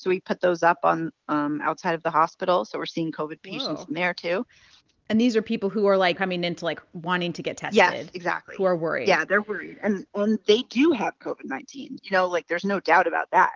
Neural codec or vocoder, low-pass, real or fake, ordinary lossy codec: none; 7.2 kHz; real; Opus, 24 kbps